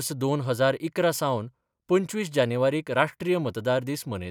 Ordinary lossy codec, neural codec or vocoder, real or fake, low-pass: none; none; real; 19.8 kHz